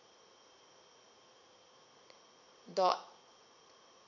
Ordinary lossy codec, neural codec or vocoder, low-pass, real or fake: none; vocoder, 44.1 kHz, 80 mel bands, Vocos; 7.2 kHz; fake